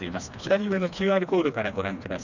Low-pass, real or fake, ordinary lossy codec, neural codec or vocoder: 7.2 kHz; fake; none; codec, 16 kHz, 2 kbps, FreqCodec, smaller model